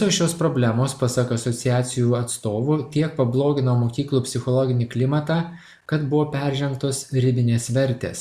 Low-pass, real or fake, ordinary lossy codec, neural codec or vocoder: 14.4 kHz; real; Opus, 64 kbps; none